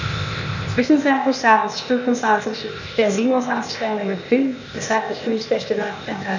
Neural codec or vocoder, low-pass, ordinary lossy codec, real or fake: codec, 16 kHz, 0.8 kbps, ZipCodec; 7.2 kHz; none; fake